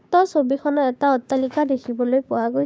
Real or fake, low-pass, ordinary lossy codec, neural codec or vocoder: fake; none; none; codec, 16 kHz, 6 kbps, DAC